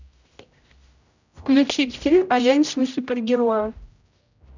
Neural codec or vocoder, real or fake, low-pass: codec, 16 kHz, 0.5 kbps, X-Codec, HuBERT features, trained on general audio; fake; 7.2 kHz